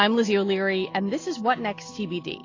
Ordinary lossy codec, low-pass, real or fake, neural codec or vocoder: AAC, 32 kbps; 7.2 kHz; real; none